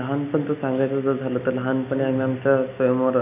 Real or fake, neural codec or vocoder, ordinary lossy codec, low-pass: real; none; none; 3.6 kHz